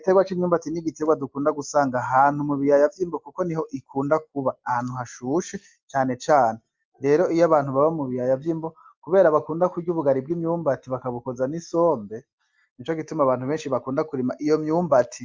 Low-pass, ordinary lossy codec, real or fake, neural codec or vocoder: 7.2 kHz; Opus, 32 kbps; real; none